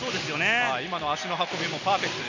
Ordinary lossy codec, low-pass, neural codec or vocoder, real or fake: none; 7.2 kHz; none; real